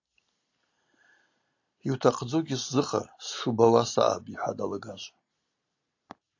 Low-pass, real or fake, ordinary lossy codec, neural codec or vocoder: 7.2 kHz; real; AAC, 48 kbps; none